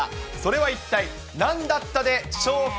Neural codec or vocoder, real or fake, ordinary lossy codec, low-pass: none; real; none; none